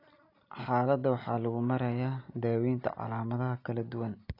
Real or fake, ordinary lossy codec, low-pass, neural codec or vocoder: real; AAC, 32 kbps; 5.4 kHz; none